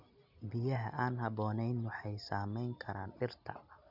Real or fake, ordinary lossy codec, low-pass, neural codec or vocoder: real; none; 5.4 kHz; none